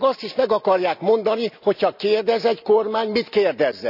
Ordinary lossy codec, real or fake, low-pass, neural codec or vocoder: none; real; 5.4 kHz; none